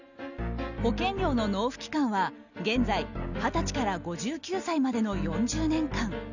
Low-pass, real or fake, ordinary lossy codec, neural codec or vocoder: 7.2 kHz; real; none; none